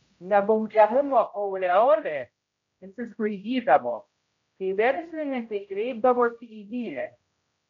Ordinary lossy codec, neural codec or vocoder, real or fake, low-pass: MP3, 48 kbps; codec, 16 kHz, 0.5 kbps, X-Codec, HuBERT features, trained on general audio; fake; 7.2 kHz